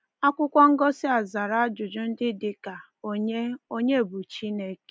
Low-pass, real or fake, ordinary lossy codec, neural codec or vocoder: none; real; none; none